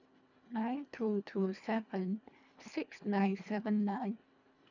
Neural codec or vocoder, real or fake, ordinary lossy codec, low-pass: codec, 24 kHz, 1.5 kbps, HILCodec; fake; none; 7.2 kHz